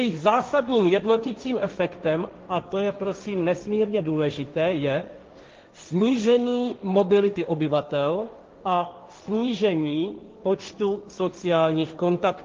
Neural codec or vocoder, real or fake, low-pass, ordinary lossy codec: codec, 16 kHz, 1.1 kbps, Voila-Tokenizer; fake; 7.2 kHz; Opus, 32 kbps